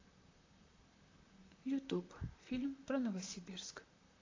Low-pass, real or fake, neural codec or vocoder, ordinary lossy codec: 7.2 kHz; fake; vocoder, 44.1 kHz, 128 mel bands, Pupu-Vocoder; AAC, 32 kbps